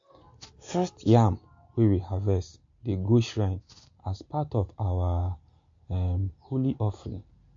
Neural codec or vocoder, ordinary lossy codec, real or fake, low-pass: none; MP3, 48 kbps; real; 7.2 kHz